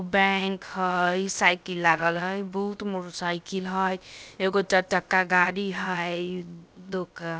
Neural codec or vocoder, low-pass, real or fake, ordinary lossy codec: codec, 16 kHz, about 1 kbps, DyCAST, with the encoder's durations; none; fake; none